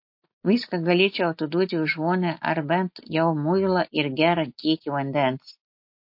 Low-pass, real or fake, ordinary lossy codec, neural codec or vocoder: 5.4 kHz; real; MP3, 32 kbps; none